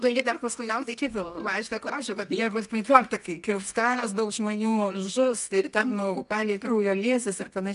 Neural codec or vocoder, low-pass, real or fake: codec, 24 kHz, 0.9 kbps, WavTokenizer, medium music audio release; 10.8 kHz; fake